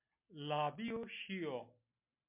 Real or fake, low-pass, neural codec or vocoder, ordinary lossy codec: real; 3.6 kHz; none; MP3, 32 kbps